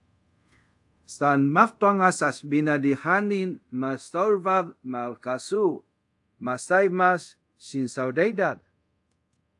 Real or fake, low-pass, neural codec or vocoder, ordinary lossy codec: fake; 10.8 kHz; codec, 24 kHz, 0.5 kbps, DualCodec; AAC, 64 kbps